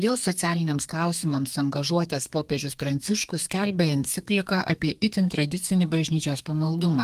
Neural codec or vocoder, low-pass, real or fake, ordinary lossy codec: codec, 32 kHz, 1.9 kbps, SNAC; 14.4 kHz; fake; Opus, 32 kbps